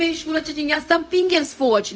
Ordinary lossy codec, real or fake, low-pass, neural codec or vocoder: none; fake; none; codec, 16 kHz, 0.4 kbps, LongCat-Audio-Codec